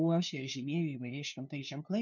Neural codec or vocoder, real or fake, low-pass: codec, 16 kHz, 2 kbps, FunCodec, trained on LibriTTS, 25 frames a second; fake; 7.2 kHz